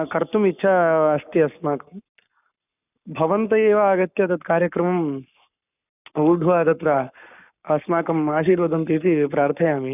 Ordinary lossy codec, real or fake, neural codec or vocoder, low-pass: none; fake; codec, 44.1 kHz, 7.8 kbps, Pupu-Codec; 3.6 kHz